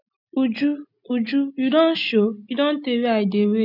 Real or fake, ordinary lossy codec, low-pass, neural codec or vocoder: real; none; 5.4 kHz; none